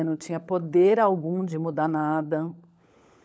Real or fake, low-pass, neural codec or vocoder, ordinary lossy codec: fake; none; codec, 16 kHz, 16 kbps, FunCodec, trained on LibriTTS, 50 frames a second; none